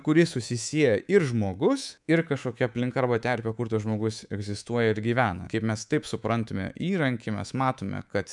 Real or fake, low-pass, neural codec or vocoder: fake; 10.8 kHz; codec, 24 kHz, 3.1 kbps, DualCodec